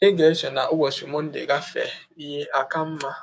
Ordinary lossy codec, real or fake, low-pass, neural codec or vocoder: none; fake; none; codec, 16 kHz, 6 kbps, DAC